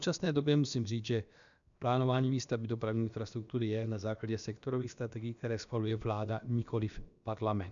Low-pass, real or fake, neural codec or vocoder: 7.2 kHz; fake; codec, 16 kHz, about 1 kbps, DyCAST, with the encoder's durations